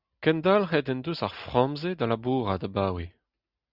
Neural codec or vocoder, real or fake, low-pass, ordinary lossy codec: none; real; 5.4 kHz; Opus, 64 kbps